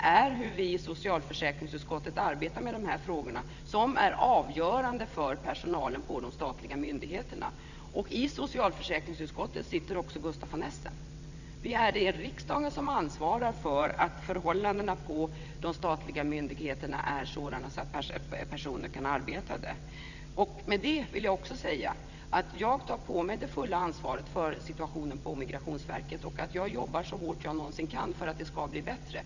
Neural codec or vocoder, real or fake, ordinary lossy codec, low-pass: vocoder, 22.05 kHz, 80 mel bands, WaveNeXt; fake; none; 7.2 kHz